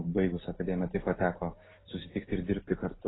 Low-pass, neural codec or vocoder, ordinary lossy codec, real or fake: 7.2 kHz; none; AAC, 16 kbps; real